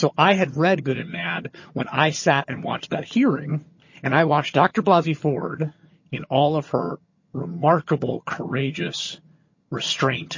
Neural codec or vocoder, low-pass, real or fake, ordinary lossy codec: vocoder, 22.05 kHz, 80 mel bands, HiFi-GAN; 7.2 kHz; fake; MP3, 32 kbps